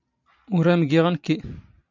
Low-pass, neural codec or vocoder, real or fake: 7.2 kHz; none; real